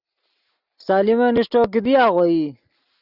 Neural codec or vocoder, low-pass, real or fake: none; 5.4 kHz; real